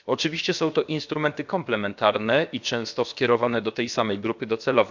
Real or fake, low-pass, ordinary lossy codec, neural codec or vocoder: fake; 7.2 kHz; none; codec, 16 kHz, about 1 kbps, DyCAST, with the encoder's durations